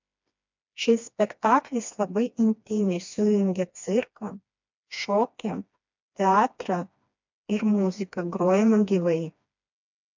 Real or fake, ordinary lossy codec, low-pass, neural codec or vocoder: fake; MP3, 64 kbps; 7.2 kHz; codec, 16 kHz, 2 kbps, FreqCodec, smaller model